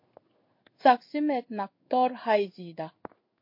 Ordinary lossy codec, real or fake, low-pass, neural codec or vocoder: MP3, 32 kbps; fake; 5.4 kHz; codec, 16 kHz in and 24 kHz out, 1 kbps, XY-Tokenizer